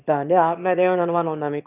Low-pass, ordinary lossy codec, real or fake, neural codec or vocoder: 3.6 kHz; none; fake; autoencoder, 22.05 kHz, a latent of 192 numbers a frame, VITS, trained on one speaker